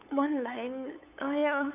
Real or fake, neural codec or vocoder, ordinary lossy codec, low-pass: fake; codec, 16 kHz, 8 kbps, FunCodec, trained on LibriTTS, 25 frames a second; none; 3.6 kHz